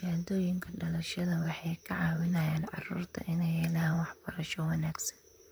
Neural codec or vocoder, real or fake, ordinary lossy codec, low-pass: vocoder, 44.1 kHz, 128 mel bands, Pupu-Vocoder; fake; none; none